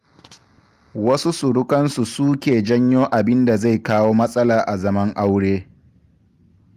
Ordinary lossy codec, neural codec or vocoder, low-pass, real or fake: Opus, 32 kbps; none; 14.4 kHz; real